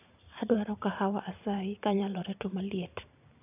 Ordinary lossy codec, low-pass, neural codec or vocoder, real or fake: none; 3.6 kHz; vocoder, 44.1 kHz, 128 mel bands every 512 samples, BigVGAN v2; fake